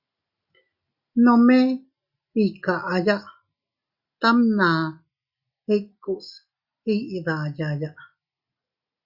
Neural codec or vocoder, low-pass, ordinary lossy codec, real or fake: none; 5.4 kHz; Opus, 64 kbps; real